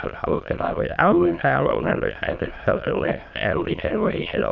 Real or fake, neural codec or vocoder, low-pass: fake; autoencoder, 22.05 kHz, a latent of 192 numbers a frame, VITS, trained on many speakers; 7.2 kHz